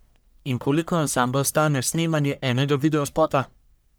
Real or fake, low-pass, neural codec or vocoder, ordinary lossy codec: fake; none; codec, 44.1 kHz, 1.7 kbps, Pupu-Codec; none